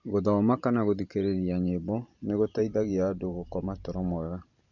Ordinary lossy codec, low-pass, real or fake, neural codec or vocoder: none; 7.2 kHz; fake; vocoder, 44.1 kHz, 128 mel bands every 512 samples, BigVGAN v2